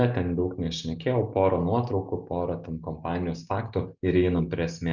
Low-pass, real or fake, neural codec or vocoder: 7.2 kHz; real; none